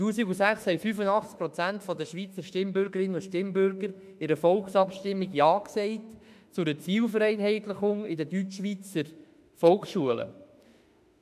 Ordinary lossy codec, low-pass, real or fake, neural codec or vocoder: MP3, 96 kbps; 14.4 kHz; fake; autoencoder, 48 kHz, 32 numbers a frame, DAC-VAE, trained on Japanese speech